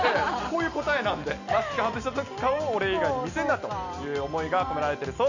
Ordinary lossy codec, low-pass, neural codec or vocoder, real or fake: Opus, 64 kbps; 7.2 kHz; none; real